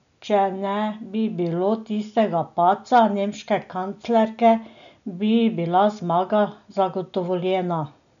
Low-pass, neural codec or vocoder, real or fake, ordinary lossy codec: 7.2 kHz; none; real; none